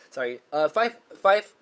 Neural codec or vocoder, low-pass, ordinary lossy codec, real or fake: codec, 16 kHz, 8 kbps, FunCodec, trained on Chinese and English, 25 frames a second; none; none; fake